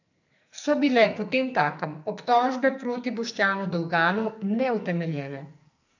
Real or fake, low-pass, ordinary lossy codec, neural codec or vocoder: fake; 7.2 kHz; none; codec, 44.1 kHz, 2.6 kbps, SNAC